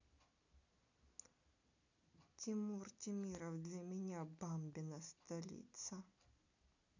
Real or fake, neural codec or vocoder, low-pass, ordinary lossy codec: real; none; 7.2 kHz; none